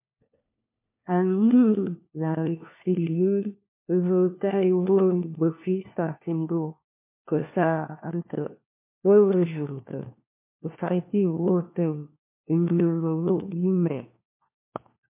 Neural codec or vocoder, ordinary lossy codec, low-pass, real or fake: codec, 16 kHz, 1 kbps, FunCodec, trained on LibriTTS, 50 frames a second; MP3, 24 kbps; 3.6 kHz; fake